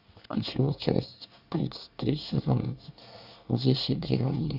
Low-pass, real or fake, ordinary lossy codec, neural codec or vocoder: 5.4 kHz; fake; Opus, 64 kbps; codec, 24 kHz, 1 kbps, SNAC